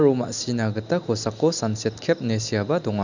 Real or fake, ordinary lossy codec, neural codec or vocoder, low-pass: real; none; none; 7.2 kHz